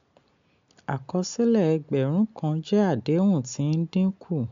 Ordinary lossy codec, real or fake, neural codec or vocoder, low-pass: MP3, 64 kbps; real; none; 7.2 kHz